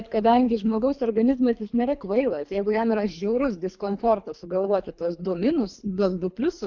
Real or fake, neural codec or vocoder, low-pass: fake; codec, 24 kHz, 3 kbps, HILCodec; 7.2 kHz